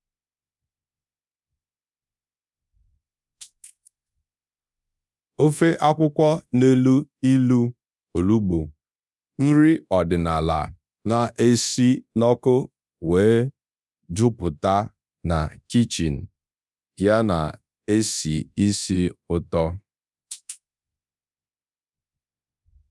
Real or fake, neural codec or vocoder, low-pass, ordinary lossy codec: fake; codec, 24 kHz, 0.9 kbps, DualCodec; none; none